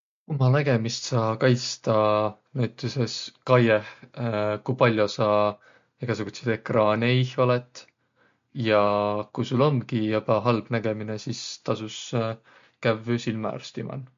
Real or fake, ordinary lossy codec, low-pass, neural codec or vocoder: real; MP3, 48 kbps; 7.2 kHz; none